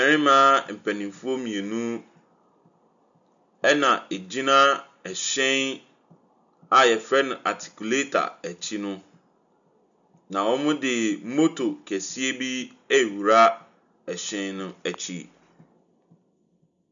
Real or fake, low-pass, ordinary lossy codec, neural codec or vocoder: real; 7.2 kHz; MP3, 96 kbps; none